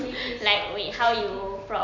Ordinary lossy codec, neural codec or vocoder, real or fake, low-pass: none; none; real; 7.2 kHz